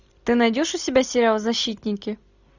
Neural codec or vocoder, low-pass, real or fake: none; 7.2 kHz; real